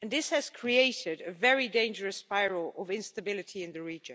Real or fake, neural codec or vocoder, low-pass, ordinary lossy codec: real; none; none; none